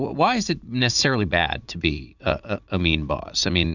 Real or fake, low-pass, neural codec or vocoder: fake; 7.2 kHz; vocoder, 44.1 kHz, 80 mel bands, Vocos